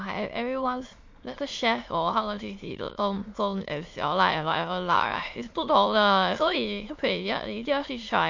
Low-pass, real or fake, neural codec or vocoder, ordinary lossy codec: 7.2 kHz; fake; autoencoder, 22.05 kHz, a latent of 192 numbers a frame, VITS, trained on many speakers; MP3, 48 kbps